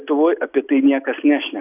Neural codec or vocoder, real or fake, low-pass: none; real; 3.6 kHz